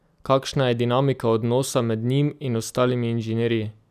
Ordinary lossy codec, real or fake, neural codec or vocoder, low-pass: none; real; none; 14.4 kHz